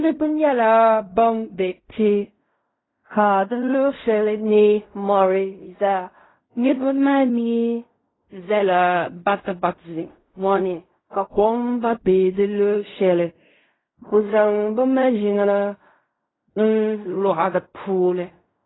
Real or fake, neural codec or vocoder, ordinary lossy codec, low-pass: fake; codec, 16 kHz in and 24 kHz out, 0.4 kbps, LongCat-Audio-Codec, fine tuned four codebook decoder; AAC, 16 kbps; 7.2 kHz